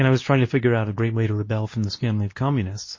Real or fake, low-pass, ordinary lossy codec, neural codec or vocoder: fake; 7.2 kHz; MP3, 32 kbps; codec, 24 kHz, 0.9 kbps, WavTokenizer, medium speech release version 2